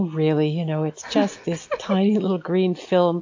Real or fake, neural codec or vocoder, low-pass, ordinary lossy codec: real; none; 7.2 kHz; AAC, 48 kbps